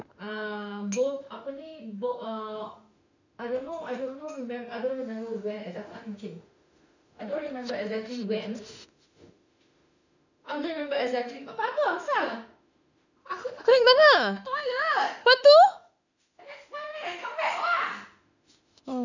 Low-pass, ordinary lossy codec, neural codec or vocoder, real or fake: 7.2 kHz; none; autoencoder, 48 kHz, 32 numbers a frame, DAC-VAE, trained on Japanese speech; fake